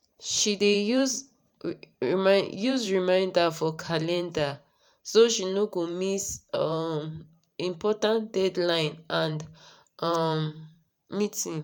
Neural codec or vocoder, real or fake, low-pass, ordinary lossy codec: vocoder, 44.1 kHz, 128 mel bands every 512 samples, BigVGAN v2; fake; 19.8 kHz; MP3, 96 kbps